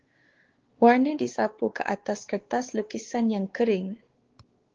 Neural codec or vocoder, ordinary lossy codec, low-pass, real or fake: codec, 16 kHz, 4 kbps, X-Codec, WavLM features, trained on Multilingual LibriSpeech; Opus, 16 kbps; 7.2 kHz; fake